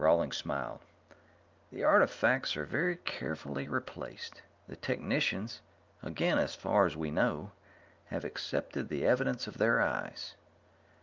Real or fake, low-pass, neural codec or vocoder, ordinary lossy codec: real; 7.2 kHz; none; Opus, 24 kbps